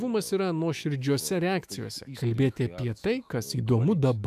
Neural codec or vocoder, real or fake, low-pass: autoencoder, 48 kHz, 128 numbers a frame, DAC-VAE, trained on Japanese speech; fake; 14.4 kHz